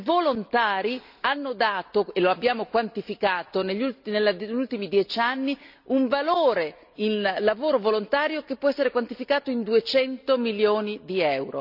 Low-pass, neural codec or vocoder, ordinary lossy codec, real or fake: 5.4 kHz; none; none; real